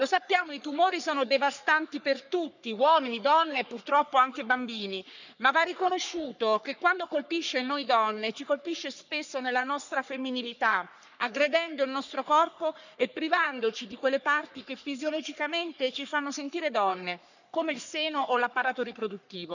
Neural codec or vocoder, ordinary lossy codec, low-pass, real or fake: codec, 44.1 kHz, 3.4 kbps, Pupu-Codec; none; 7.2 kHz; fake